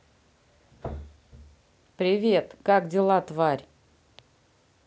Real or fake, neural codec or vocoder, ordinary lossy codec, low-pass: real; none; none; none